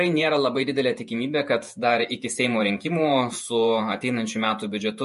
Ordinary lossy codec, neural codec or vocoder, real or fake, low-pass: MP3, 48 kbps; vocoder, 48 kHz, 128 mel bands, Vocos; fake; 14.4 kHz